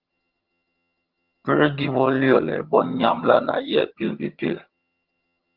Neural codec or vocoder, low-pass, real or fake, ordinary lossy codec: vocoder, 22.05 kHz, 80 mel bands, HiFi-GAN; 5.4 kHz; fake; Opus, 32 kbps